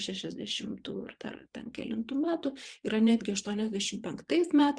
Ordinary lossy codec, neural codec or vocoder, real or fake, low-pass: Opus, 64 kbps; vocoder, 22.05 kHz, 80 mel bands, WaveNeXt; fake; 9.9 kHz